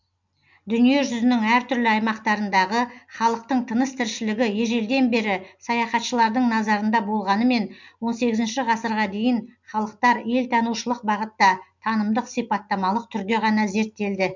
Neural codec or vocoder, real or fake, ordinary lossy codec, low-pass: none; real; MP3, 64 kbps; 7.2 kHz